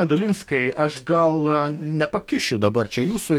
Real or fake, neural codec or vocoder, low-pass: fake; codec, 44.1 kHz, 2.6 kbps, DAC; 19.8 kHz